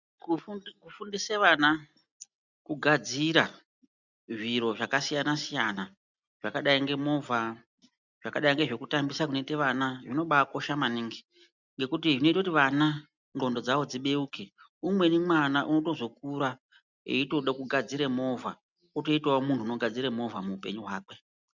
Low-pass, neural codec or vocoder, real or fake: 7.2 kHz; none; real